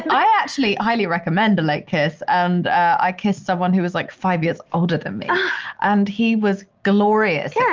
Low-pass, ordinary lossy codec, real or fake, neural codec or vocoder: 7.2 kHz; Opus, 24 kbps; real; none